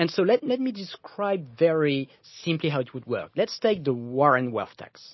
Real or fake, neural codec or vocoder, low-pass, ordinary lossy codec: real; none; 7.2 kHz; MP3, 24 kbps